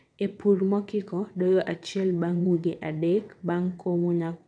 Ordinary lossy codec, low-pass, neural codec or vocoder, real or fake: none; 9.9 kHz; vocoder, 22.05 kHz, 80 mel bands, WaveNeXt; fake